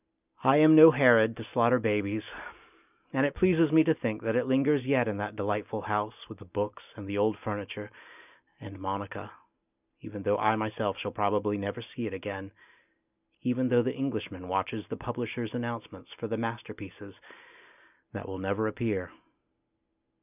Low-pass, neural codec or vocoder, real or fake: 3.6 kHz; none; real